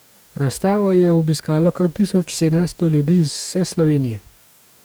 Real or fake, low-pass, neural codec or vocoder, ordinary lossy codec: fake; none; codec, 44.1 kHz, 2.6 kbps, DAC; none